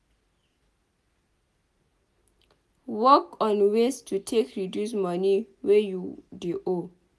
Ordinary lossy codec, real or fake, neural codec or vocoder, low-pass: none; real; none; none